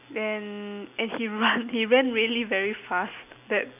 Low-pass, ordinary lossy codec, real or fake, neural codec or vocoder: 3.6 kHz; none; real; none